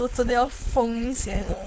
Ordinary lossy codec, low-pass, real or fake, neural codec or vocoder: none; none; fake; codec, 16 kHz, 4.8 kbps, FACodec